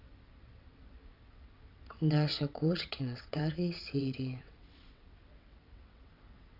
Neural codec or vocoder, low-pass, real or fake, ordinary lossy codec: vocoder, 22.05 kHz, 80 mel bands, WaveNeXt; 5.4 kHz; fake; none